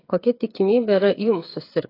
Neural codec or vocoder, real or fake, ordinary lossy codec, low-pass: codec, 16 kHz, 8 kbps, FreqCodec, smaller model; fake; AAC, 24 kbps; 5.4 kHz